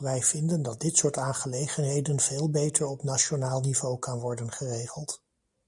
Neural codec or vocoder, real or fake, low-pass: none; real; 10.8 kHz